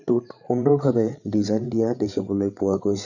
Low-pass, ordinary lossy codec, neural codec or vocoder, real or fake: 7.2 kHz; none; codec, 16 kHz, 8 kbps, FreqCodec, larger model; fake